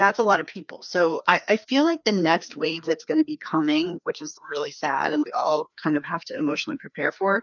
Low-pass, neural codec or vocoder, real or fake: 7.2 kHz; codec, 16 kHz, 2 kbps, FreqCodec, larger model; fake